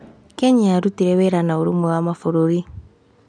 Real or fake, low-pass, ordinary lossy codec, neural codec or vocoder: real; 9.9 kHz; none; none